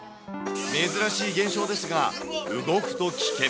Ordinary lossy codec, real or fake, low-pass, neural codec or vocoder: none; real; none; none